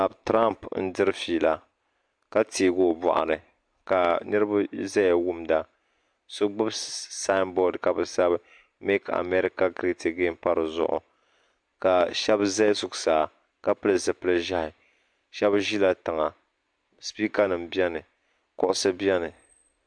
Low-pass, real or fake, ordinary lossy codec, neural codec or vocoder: 9.9 kHz; real; MP3, 64 kbps; none